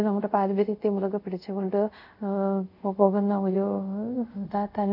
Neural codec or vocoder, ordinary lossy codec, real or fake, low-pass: codec, 24 kHz, 0.5 kbps, DualCodec; none; fake; 5.4 kHz